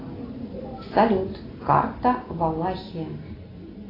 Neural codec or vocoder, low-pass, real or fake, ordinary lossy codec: none; 5.4 kHz; real; AAC, 24 kbps